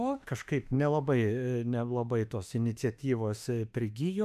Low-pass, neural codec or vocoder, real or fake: 14.4 kHz; autoencoder, 48 kHz, 32 numbers a frame, DAC-VAE, trained on Japanese speech; fake